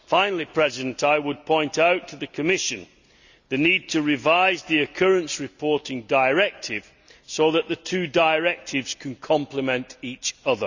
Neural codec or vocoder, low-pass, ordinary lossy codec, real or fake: none; 7.2 kHz; none; real